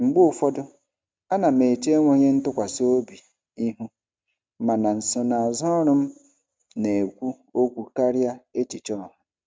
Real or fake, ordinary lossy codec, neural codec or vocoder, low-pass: real; none; none; none